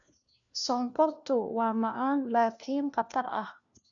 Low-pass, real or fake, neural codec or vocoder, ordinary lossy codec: 7.2 kHz; fake; codec, 16 kHz, 1 kbps, FunCodec, trained on LibriTTS, 50 frames a second; none